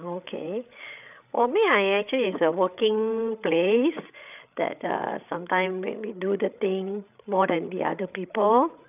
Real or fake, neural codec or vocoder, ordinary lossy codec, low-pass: fake; codec, 16 kHz, 8 kbps, FreqCodec, larger model; none; 3.6 kHz